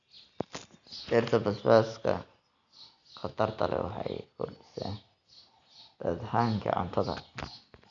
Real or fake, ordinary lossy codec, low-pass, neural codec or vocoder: real; none; 7.2 kHz; none